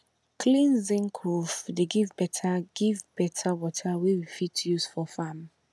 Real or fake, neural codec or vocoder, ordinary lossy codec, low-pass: real; none; none; none